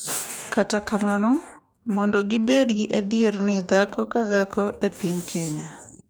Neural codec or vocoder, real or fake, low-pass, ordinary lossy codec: codec, 44.1 kHz, 2.6 kbps, DAC; fake; none; none